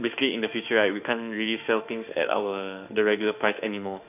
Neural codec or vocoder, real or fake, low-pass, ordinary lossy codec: autoencoder, 48 kHz, 32 numbers a frame, DAC-VAE, trained on Japanese speech; fake; 3.6 kHz; none